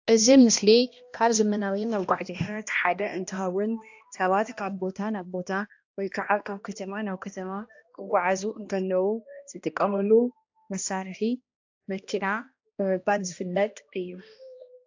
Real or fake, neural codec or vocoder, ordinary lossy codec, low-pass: fake; codec, 16 kHz, 1 kbps, X-Codec, HuBERT features, trained on balanced general audio; AAC, 48 kbps; 7.2 kHz